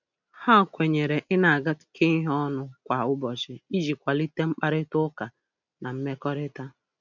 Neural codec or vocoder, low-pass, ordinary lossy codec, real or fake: none; 7.2 kHz; none; real